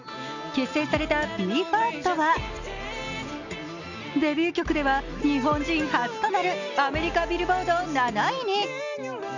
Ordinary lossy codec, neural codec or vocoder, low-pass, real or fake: none; none; 7.2 kHz; real